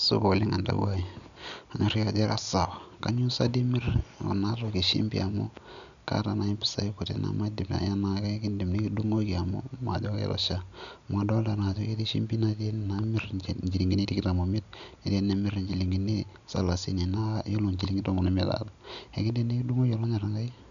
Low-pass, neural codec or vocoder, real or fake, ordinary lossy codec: 7.2 kHz; none; real; none